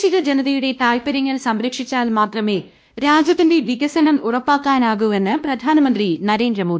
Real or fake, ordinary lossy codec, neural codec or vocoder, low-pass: fake; none; codec, 16 kHz, 1 kbps, X-Codec, WavLM features, trained on Multilingual LibriSpeech; none